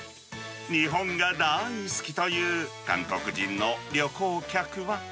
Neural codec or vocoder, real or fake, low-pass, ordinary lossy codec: none; real; none; none